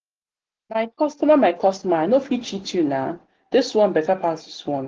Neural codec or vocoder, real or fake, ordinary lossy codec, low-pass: none; real; Opus, 16 kbps; 7.2 kHz